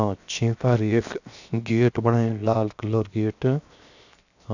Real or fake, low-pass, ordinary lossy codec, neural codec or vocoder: fake; 7.2 kHz; none; codec, 16 kHz, 0.7 kbps, FocalCodec